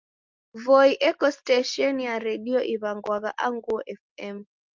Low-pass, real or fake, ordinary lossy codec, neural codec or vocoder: 7.2 kHz; real; Opus, 32 kbps; none